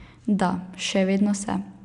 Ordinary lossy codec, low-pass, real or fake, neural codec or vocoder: none; 10.8 kHz; real; none